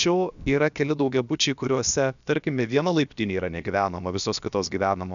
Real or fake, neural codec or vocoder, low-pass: fake; codec, 16 kHz, about 1 kbps, DyCAST, with the encoder's durations; 7.2 kHz